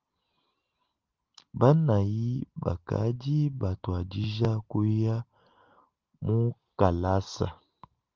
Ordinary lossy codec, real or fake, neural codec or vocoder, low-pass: Opus, 32 kbps; real; none; 7.2 kHz